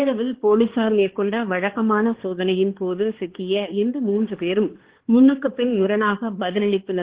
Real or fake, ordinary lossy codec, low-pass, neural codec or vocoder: fake; Opus, 16 kbps; 3.6 kHz; codec, 16 kHz, 2 kbps, X-Codec, HuBERT features, trained on balanced general audio